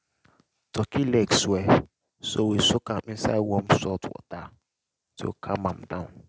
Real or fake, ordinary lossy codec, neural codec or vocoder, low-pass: real; none; none; none